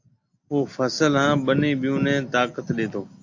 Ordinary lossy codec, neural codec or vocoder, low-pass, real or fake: AAC, 48 kbps; none; 7.2 kHz; real